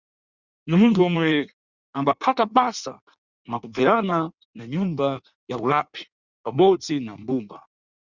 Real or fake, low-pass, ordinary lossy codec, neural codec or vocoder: fake; 7.2 kHz; Opus, 64 kbps; codec, 16 kHz in and 24 kHz out, 1.1 kbps, FireRedTTS-2 codec